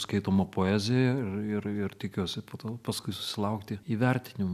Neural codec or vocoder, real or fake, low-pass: none; real; 14.4 kHz